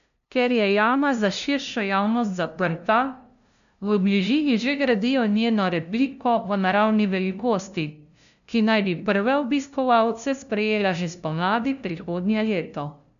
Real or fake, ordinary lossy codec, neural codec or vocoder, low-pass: fake; none; codec, 16 kHz, 0.5 kbps, FunCodec, trained on LibriTTS, 25 frames a second; 7.2 kHz